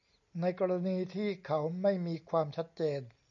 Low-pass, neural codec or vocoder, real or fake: 7.2 kHz; none; real